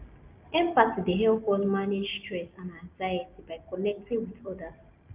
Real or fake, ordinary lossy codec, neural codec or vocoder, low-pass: real; Opus, 24 kbps; none; 3.6 kHz